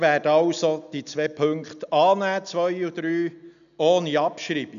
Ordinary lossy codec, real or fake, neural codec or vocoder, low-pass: none; real; none; 7.2 kHz